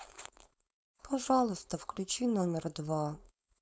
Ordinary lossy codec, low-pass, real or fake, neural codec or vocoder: none; none; fake; codec, 16 kHz, 4.8 kbps, FACodec